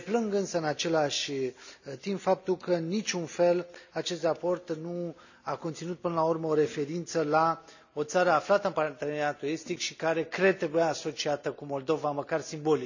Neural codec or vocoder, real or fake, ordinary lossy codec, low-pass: none; real; none; 7.2 kHz